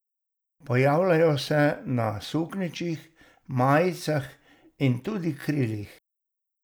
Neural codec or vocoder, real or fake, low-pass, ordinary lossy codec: none; real; none; none